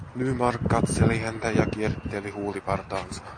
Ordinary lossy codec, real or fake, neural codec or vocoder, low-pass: MP3, 48 kbps; real; none; 9.9 kHz